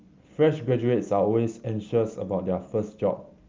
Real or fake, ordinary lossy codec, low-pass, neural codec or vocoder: real; Opus, 24 kbps; 7.2 kHz; none